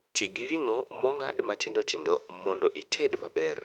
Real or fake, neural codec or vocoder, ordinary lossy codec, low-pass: fake; autoencoder, 48 kHz, 32 numbers a frame, DAC-VAE, trained on Japanese speech; none; 19.8 kHz